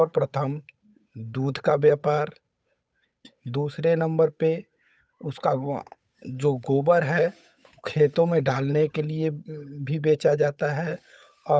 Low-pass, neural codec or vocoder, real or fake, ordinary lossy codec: none; codec, 16 kHz, 8 kbps, FunCodec, trained on Chinese and English, 25 frames a second; fake; none